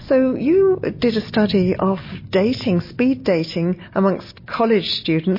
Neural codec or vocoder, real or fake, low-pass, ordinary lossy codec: none; real; 5.4 kHz; MP3, 24 kbps